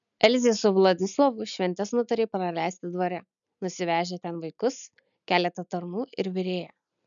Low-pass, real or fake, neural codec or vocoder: 7.2 kHz; real; none